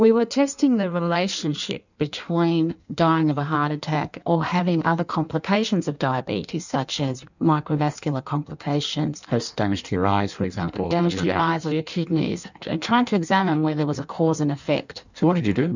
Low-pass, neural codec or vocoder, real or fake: 7.2 kHz; codec, 16 kHz in and 24 kHz out, 1.1 kbps, FireRedTTS-2 codec; fake